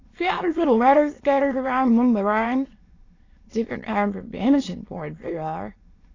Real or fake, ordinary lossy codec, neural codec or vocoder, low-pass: fake; AAC, 32 kbps; autoencoder, 22.05 kHz, a latent of 192 numbers a frame, VITS, trained on many speakers; 7.2 kHz